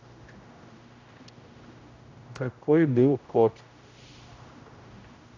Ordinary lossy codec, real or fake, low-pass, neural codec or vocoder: AAC, 48 kbps; fake; 7.2 kHz; codec, 16 kHz, 0.5 kbps, X-Codec, HuBERT features, trained on general audio